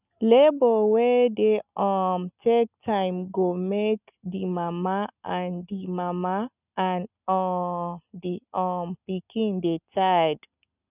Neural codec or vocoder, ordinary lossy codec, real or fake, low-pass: none; none; real; 3.6 kHz